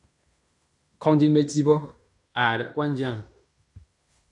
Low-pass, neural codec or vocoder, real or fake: 10.8 kHz; codec, 16 kHz in and 24 kHz out, 0.9 kbps, LongCat-Audio-Codec, fine tuned four codebook decoder; fake